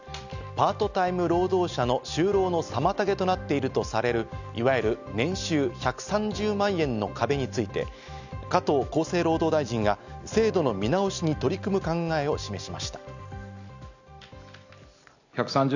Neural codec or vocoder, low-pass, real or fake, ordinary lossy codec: none; 7.2 kHz; real; none